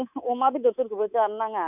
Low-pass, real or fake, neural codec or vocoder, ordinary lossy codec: 3.6 kHz; fake; codec, 24 kHz, 3.1 kbps, DualCodec; none